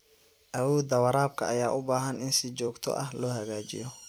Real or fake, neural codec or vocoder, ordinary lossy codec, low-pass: fake; vocoder, 44.1 kHz, 128 mel bands every 256 samples, BigVGAN v2; none; none